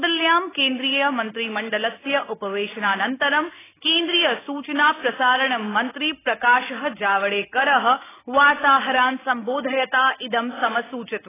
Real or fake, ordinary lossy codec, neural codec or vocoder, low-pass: real; AAC, 16 kbps; none; 3.6 kHz